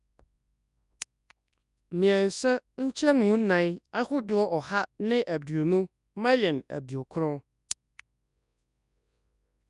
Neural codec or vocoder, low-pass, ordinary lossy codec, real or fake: codec, 24 kHz, 0.9 kbps, WavTokenizer, large speech release; 10.8 kHz; none; fake